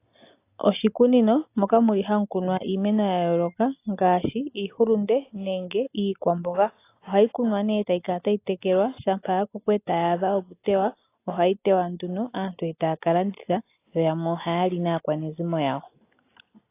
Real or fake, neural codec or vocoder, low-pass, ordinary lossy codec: real; none; 3.6 kHz; AAC, 24 kbps